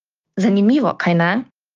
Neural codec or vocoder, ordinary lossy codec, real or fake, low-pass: codec, 16 kHz, 6 kbps, DAC; Opus, 32 kbps; fake; 7.2 kHz